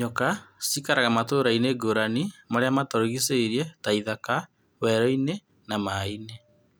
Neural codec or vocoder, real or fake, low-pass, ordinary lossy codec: none; real; none; none